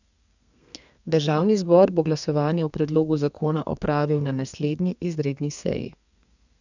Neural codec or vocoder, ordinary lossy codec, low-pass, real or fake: codec, 32 kHz, 1.9 kbps, SNAC; none; 7.2 kHz; fake